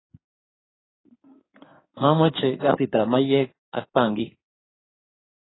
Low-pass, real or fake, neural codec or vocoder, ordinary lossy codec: 7.2 kHz; fake; codec, 16 kHz in and 24 kHz out, 2.2 kbps, FireRedTTS-2 codec; AAC, 16 kbps